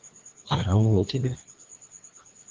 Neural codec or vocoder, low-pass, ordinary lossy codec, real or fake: codec, 16 kHz, 2 kbps, FreqCodec, larger model; 7.2 kHz; Opus, 16 kbps; fake